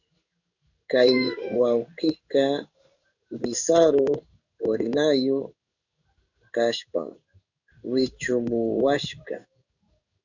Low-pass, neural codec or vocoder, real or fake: 7.2 kHz; codec, 16 kHz in and 24 kHz out, 1 kbps, XY-Tokenizer; fake